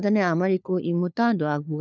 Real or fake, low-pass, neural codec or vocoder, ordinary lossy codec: fake; 7.2 kHz; codec, 16 kHz, 4 kbps, FunCodec, trained on LibriTTS, 50 frames a second; none